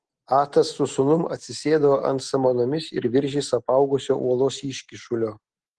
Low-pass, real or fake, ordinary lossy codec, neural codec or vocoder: 10.8 kHz; real; Opus, 16 kbps; none